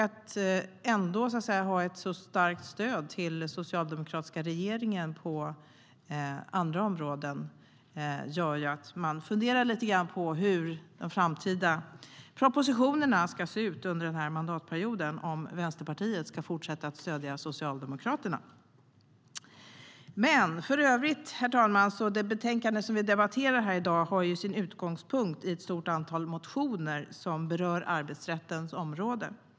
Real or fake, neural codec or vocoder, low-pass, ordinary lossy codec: real; none; none; none